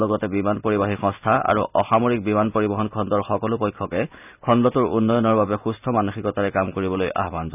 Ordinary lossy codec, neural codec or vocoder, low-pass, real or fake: none; none; 3.6 kHz; real